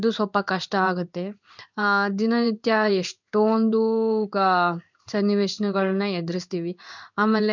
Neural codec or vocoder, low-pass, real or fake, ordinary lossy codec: codec, 16 kHz in and 24 kHz out, 1 kbps, XY-Tokenizer; 7.2 kHz; fake; none